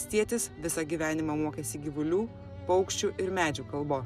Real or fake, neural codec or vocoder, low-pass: real; none; 14.4 kHz